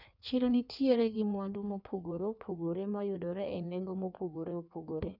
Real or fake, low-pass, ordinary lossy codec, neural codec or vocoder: fake; 5.4 kHz; none; codec, 16 kHz in and 24 kHz out, 1.1 kbps, FireRedTTS-2 codec